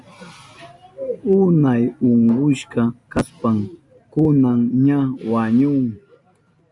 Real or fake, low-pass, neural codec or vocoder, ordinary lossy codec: real; 10.8 kHz; none; MP3, 96 kbps